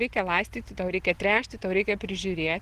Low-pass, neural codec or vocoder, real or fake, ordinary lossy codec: 14.4 kHz; none; real; Opus, 16 kbps